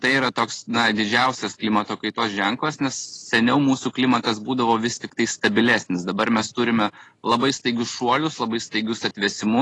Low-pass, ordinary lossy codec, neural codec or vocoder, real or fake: 10.8 kHz; AAC, 32 kbps; none; real